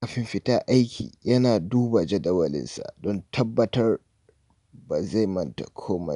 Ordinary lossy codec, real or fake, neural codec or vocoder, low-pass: none; real; none; 10.8 kHz